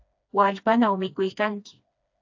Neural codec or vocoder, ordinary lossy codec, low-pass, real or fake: codec, 16 kHz, 2 kbps, FreqCodec, smaller model; AAC, 48 kbps; 7.2 kHz; fake